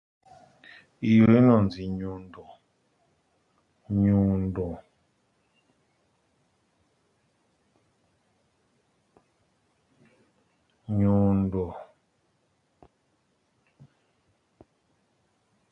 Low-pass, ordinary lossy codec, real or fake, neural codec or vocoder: 10.8 kHz; MP3, 48 kbps; real; none